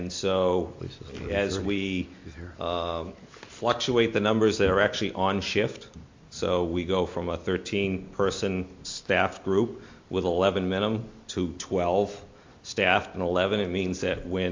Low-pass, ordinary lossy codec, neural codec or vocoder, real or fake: 7.2 kHz; MP3, 48 kbps; none; real